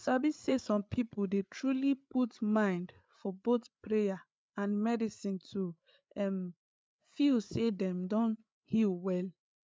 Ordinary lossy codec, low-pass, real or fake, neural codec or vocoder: none; none; fake; codec, 16 kHz, 8 kbps, FreqCodec, larger model